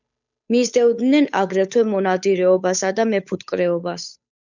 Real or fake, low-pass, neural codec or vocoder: fake; 7.2 kHz; codec, 16 kHz, 8 kbps, FunCodec, trained on Chinese and English, 25 frames a second